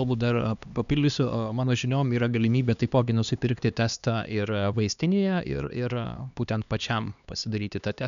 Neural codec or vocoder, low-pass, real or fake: codec, 16 kHz, 2 kbps, X-Codec, HuBERT features, trained on LibriSpeech; 7.2 kHz; fake